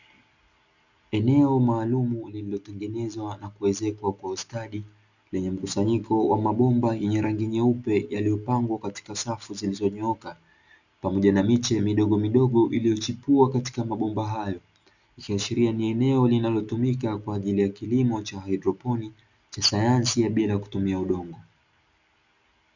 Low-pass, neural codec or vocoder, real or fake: 7.2 kHz; none; real